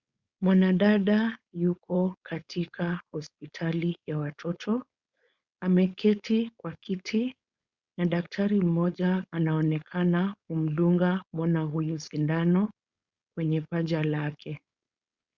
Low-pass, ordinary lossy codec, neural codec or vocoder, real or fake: 7.2 kHz; Opus, 64 kbps; codec, 16 kHz, 4.8 kbps, FACodec; fake